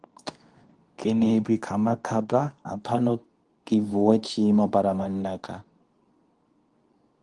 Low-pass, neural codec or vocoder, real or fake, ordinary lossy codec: 10.8 kHz; codec, 24 kHz, 0.9 kbps, WavTokenizer, medium speech release version 2; fake; Opus, 24 kbps